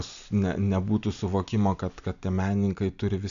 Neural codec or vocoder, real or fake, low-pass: none; real; 7.2 kHz